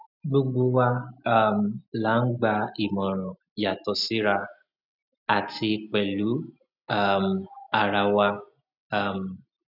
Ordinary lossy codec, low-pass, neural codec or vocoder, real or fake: none; 5.4 kHz; none; real